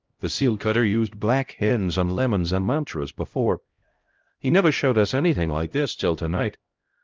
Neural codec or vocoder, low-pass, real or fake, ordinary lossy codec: codec, 16 kHz, 0.5 kbps, X-Codec, HuBERT features, trained on LibriSpeech; 7.2 kHz; fake; Opus, 32 kbps